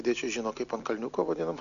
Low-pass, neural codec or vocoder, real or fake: 7.2 kHz; none; real